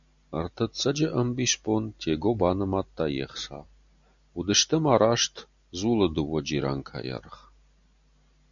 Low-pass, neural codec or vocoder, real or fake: 7.2 kHz; none; real